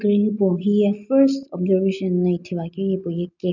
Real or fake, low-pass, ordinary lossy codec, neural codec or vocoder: real; 7.2 kHz; none; none